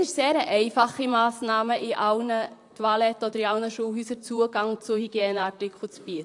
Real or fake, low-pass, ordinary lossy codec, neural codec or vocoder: fake; 10.8 kHz; AAC, 64 kbps; vocoder, 44.1 kHz, 128 mel bands, Pupu-Vocoder